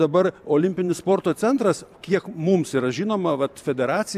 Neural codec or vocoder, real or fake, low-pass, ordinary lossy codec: vocoder, 44.1 kHz, 128 mel bands every 512 samples, BigVGAN v2; fake; 14.4 kHz; AAC, 96 kbps